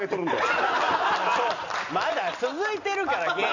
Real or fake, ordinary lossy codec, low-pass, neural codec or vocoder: real; none; 7.2 kHz; none